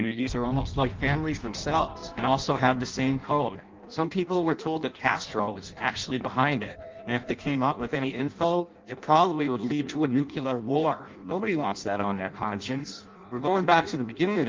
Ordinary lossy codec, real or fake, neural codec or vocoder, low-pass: Opus, 24 kbps; fake; codec, 16 kHz in and 24 kHz out, 0.6 kbps, FireRedTTS-2 codec; 7.2 kHz